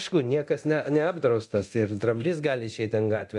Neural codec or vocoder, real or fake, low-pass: codec, 24 kHz, 0.9 kbps, DualCodec; fake; 10.8 kHz